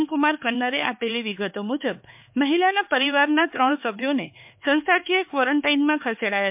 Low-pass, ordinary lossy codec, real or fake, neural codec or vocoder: 3.6 kHz; MP3, 32 kbps; fake; codec, 16 kHz, 4 kbps, X-Codec, HuBERT features, trained on LibriSpeech